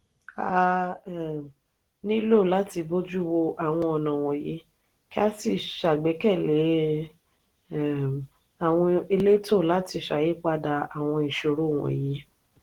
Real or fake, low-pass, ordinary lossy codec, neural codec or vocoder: real; 19.8 kHz; Opus, 16 kbps; none